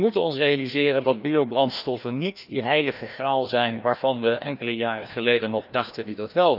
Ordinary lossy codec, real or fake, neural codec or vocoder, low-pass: none; fake; codec, 16 kHz, 1 kbps, FreqCodec, larger model; 5.4 kHz